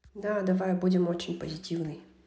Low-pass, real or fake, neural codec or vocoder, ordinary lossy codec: none; real; none; none